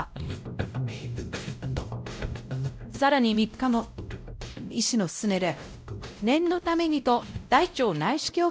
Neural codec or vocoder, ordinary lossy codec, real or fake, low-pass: codec, 16 kHz, 0.5 kbps, X-Codec, WavLM features, trained on Multilingual LibriSpeech; none; fake; none